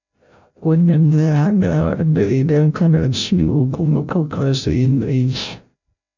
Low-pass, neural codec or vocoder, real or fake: 7.2 kHz; codec, 16 kHz, 0.5 kbps, FreqCodec, larger model; fake